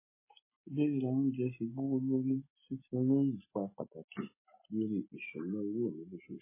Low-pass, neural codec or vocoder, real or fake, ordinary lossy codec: 3.6 kHz; none; real; MP3, 16 kbps